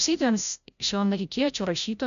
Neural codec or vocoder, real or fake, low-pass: codec, 16 kHz, 0.5 kbps, FreqCodec, larger model; fake; 7.2 kHz